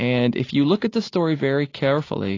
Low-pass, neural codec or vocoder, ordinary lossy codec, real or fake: 7.2 kHz; none; AAC, 32 kbps; real